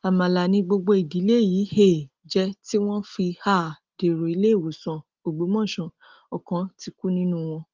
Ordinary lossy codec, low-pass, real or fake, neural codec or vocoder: Opus, 32 kbps; 7.2 kHz; real; none